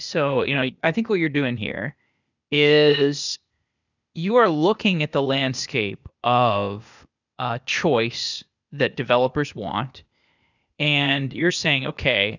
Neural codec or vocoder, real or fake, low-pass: codec, 16 kHz, 0.8 kbps, ZipCodec; fake; 7.2 kHz